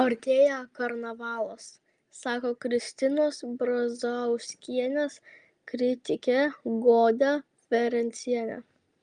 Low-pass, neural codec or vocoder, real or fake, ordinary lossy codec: 9.9 kHz; none; real; Opus, 32 kbps